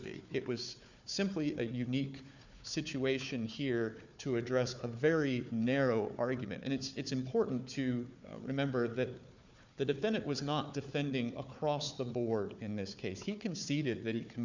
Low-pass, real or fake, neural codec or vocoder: 7.2 kHz; fake; codec, 16 kHz, 4 kbps, FunCodec, trained on Chinese and English, 50 frames a second